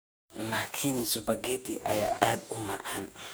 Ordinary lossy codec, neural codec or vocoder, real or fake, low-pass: none; codec, 44.1 kHz, 2.6 kbps, DAC; fake; none